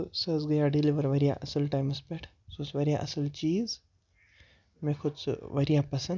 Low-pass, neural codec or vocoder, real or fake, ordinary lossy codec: 7.2 kHz; none; real; none